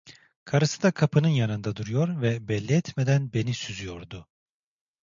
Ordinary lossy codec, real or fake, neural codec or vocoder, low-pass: MP3, 64 kbps; real; none; 7.2 kHz